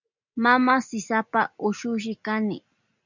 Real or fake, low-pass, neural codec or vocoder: real; 7.2 kHz; none